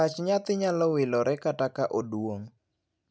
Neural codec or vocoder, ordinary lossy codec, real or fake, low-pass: none; none; real; none